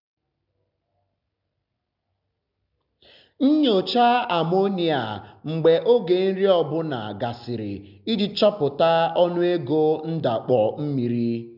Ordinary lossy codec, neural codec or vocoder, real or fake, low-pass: none; none; real; 5.4 kHz